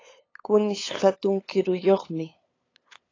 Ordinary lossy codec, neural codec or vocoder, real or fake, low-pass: AAC, 32 kbps; codec, 16 kHz, 8 kbps, FunCodec, trained on LibriTTS, 25 frames a second; fake; 7.2 kHz